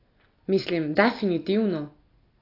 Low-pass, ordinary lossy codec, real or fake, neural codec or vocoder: 5.4 kHz; AAC, 24 kbps; real; none